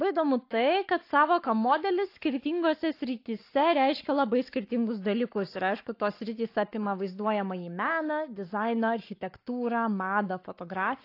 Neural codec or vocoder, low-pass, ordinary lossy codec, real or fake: codec, 44.1 kHz, 7.8 kbps, Pupu-Codec; 5.4 kHz; AAC, 32 kbps; fake